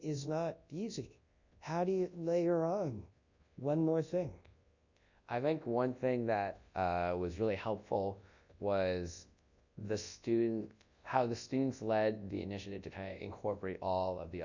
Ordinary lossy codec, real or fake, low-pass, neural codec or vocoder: Opus, 64 kbps; fake; 7.2 kHz; codec, 24 kHz, 0.9 kbps, WavTokenizer, large speech release